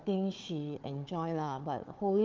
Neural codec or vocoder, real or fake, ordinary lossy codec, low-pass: codec, 16 kHz, 4 kbps, FunCodec, trained on Chinese and English, 50 frames a second; fake; Opus, 32 kbps; 7.2 kHz